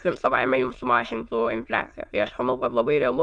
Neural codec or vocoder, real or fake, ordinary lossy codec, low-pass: autoencoder, 22.05 kHz, a latent of 192 numbers a frame, VITS, trained on many speakers; fake; Opus, 64 kbps; 9.9 kHz